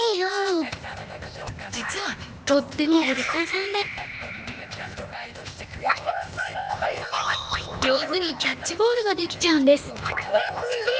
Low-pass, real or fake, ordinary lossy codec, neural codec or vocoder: none; fake; none; codec, 16 kHz, 0.8 kbps, ZipCodec